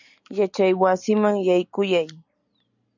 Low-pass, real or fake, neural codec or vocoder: 7.2 kHz; real; none